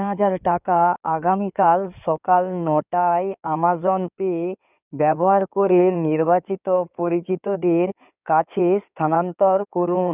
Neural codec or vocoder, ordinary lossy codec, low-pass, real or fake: codec, 16 kHz in and 24 kHz out, 2.2 kbps, FireRedTTS-2 codec; none; 3.6 kHz; fake